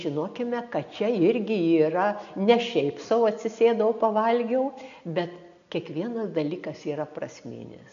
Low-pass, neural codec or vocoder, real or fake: 7.2 kHz; none; real